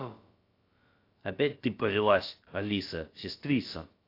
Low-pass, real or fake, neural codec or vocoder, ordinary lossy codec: 5.4 kHz; fake; codec, 16 kHz, about 1 kbps, DyCAST, with the encoder's durations; AAC, 32 kbps